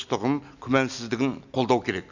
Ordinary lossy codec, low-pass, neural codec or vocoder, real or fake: none; 7.2 kHz; none; real